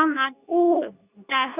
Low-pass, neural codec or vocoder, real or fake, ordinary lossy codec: 3.6 kHz; codec, 24 kHz, 0.9 kbps, WavTokenizer, medium speech release version 2; fake; none